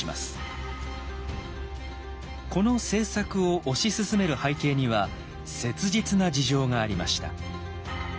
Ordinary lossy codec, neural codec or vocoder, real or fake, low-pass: none; none; real; none